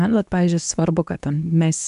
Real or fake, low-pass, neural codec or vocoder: fake; 10.8 kHz; codec, 24 kHz, 0.9 kbps, WavTokenizer, medium speech release version 2